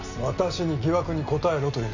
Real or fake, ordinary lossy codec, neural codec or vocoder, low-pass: real; none; none; 7.2 kHz